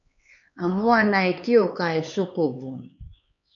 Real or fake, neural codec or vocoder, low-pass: fake; codec, 16 kHz, 4 kbps, X-Codec, HuBERT features, trained on LibriSpeech; 7.2 kHz